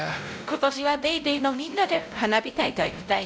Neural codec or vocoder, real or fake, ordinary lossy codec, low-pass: codec, 16 kHz, 0.5 kbps, X-Codec, WavLM features, trained on Multilingual LibriSpeech; fake; none; none